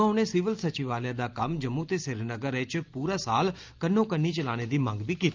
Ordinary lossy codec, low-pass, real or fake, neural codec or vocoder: Opus, 24 kbps; 7.2 kHz; real; none